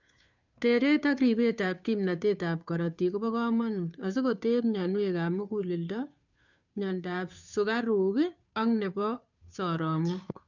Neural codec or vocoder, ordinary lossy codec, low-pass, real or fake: codec, 16 kHz, 2 kbps, FunCodec, trained on Chinese and English, 25 frames a second; none; 7.2 kHz; fake